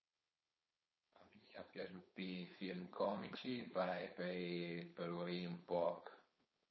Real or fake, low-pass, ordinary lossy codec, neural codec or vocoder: fake; 7.2 kHz; MP3, 24 kbps; codec, 16 kHz, 4.8 kbps, FACodec